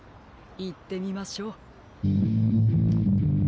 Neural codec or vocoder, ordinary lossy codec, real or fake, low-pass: none; none; real; none